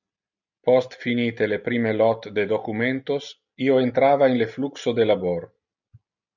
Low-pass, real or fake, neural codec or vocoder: 7.2 kHz; real; none